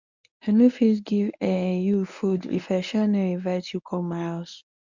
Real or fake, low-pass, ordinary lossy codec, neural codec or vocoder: fake; 7.2 kHz; none; codec, 24 kHz, 0.9 kbps, WavTokenizer, medium speech release version 1